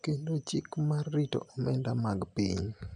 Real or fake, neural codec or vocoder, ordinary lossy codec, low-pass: real; none; none; 9.9 kHz